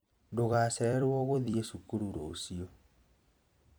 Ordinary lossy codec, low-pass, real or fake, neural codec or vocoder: none; none; fake; vocoder, 44.1 kHz, 128 mel bands every 256 samples, BigVGAN v2